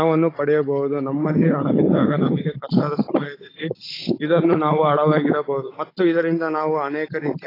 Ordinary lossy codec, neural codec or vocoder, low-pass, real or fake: AAC, 24 kbps; codec, 16 kHz, 16 kbps, FunCodec, trained on Chinese and English, 50 frames a second; 5.4 kHz; fake